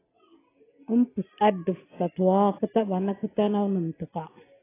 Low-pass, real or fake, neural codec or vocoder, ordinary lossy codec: 3.6 kHz; real; none; AAC, 16 kbps